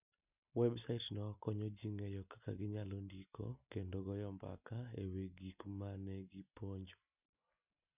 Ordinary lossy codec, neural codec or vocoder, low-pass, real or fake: none; none; 3.6 kHz; real